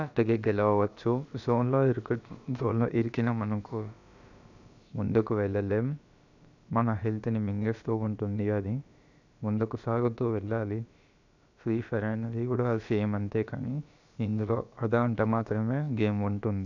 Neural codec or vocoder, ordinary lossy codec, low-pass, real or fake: codec, 16 kHz, about 1 kbps, DyCAST, with the encoder's durations; none; 7.2 kHz; fake